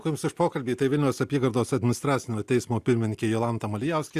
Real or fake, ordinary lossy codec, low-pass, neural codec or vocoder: real; Opus, 64 kbps; 14.4 kHz; none